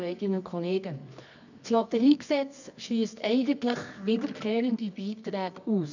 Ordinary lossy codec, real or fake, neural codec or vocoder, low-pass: none; fake; codec, 24 kHz, 0.9 kbps, WavTokenizer, medium music audio release; 7.2 kHz